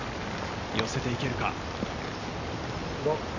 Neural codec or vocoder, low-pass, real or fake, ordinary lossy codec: none; 7.2 kHz; real; none